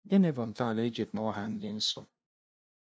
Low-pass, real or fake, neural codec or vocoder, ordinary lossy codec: none; fake; codec, 16 kHz, 0.5 kbps, FunCodec, trained on LibriTTS, 25 frames a second; none